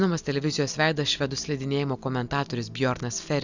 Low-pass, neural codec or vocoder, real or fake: 7.2 kHz; none; real